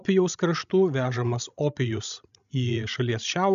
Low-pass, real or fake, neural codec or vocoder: 7.2 kHz; fake; codec, 16 kHz, 16 kbps, FreqCodec, larger model